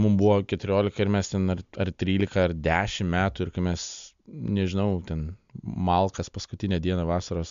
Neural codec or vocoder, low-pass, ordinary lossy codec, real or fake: none; 7.2 kHz; MP3, 64 kbps; real